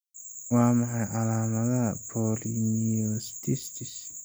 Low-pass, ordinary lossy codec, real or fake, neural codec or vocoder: none; none; real; none